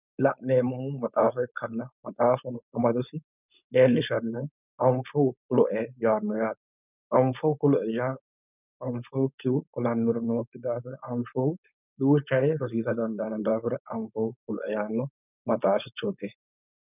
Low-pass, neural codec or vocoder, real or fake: 3.6 kHz; codec, 16 kHz, 4.8 kbps, FACodec; fake